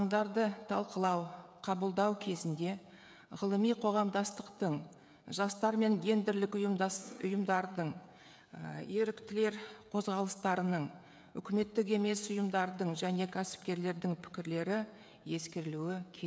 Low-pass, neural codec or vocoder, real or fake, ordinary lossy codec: none; codec, 16 kHz, 16 kbps, FreqCodec, smaller model; fake; none